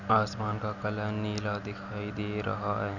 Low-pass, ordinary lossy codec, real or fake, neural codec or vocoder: 7.2 kHz; none; real; none